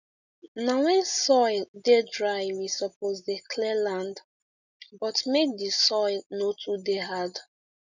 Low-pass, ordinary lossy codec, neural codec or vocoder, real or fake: 7.2 kHz; none; none; real